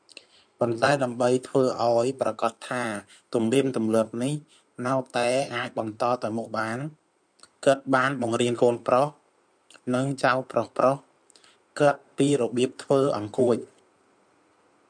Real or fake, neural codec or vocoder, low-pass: fake; codec, 16 kHz in and 24 kHz out, 2.2 kbps, FireRedTTS-2 codec; 9.9 kHz